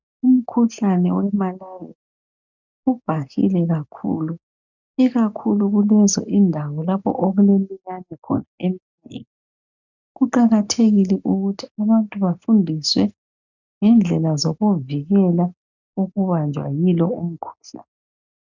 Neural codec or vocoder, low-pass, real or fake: none; 7.2 kHz; real